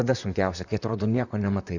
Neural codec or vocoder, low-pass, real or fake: vocoder, 44.1 kHz, 128 mel bands, Pupu-Vocoder; 7.2 kHz; fake